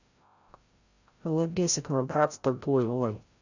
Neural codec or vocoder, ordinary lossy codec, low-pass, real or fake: codec, 16 kHz, 0.5 kbps, FreqCodec, larger model; Opus, 64 kbps; 7.2 kHz; fake